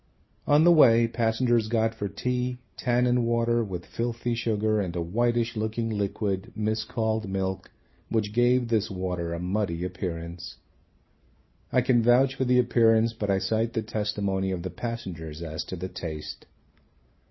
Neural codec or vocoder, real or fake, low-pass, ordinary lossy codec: none; real; 7.2 kHz; MP3, 24 kbps